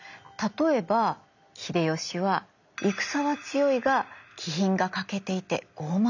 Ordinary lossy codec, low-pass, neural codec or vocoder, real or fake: none; 7.2 kHz; none; real